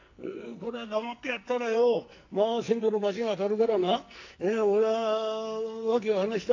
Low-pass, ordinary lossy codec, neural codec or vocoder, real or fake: 7.2 kHz; AAC, 48 kbps; codec, 44.1 kHz, 2.6 kbps, SNAC; fake